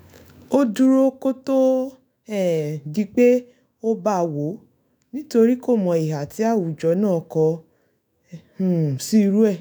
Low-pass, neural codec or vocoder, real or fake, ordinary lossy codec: none; autoencoder, 48 kHz, 128 numbers a frame, DAC-VAE, trained on Japanese speech; fake; none